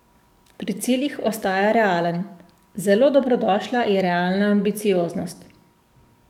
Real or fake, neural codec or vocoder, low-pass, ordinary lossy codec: fake; codec, 44.1 kHz, 7.8 kbps, DAC; 19.8 kHz; none